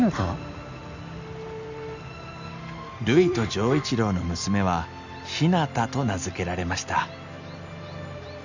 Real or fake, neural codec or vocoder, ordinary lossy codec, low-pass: real; none; none; 7.2 kHz